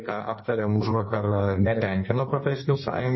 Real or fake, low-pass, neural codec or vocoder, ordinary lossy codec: fake; 7.2 kHz; codec, 16 kHz in and 24 kHz out, 1.1 kbps, FireRedTTS-2 codec; MP3, 24 kbps